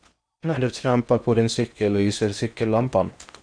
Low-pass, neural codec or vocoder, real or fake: 9.9 kHz; codec, 16 kHz in and 24 kHz out, 0.8 kbps, FocalCodec, streaming, 65536 codes; fake